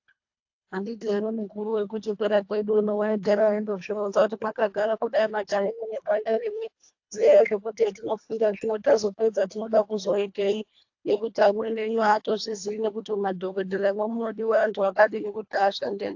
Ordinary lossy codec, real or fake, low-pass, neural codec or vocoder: AAC, 48 kbps; fake; 7.2 kHz; codec, 24 kHz, 1.5 kbps, HILCodec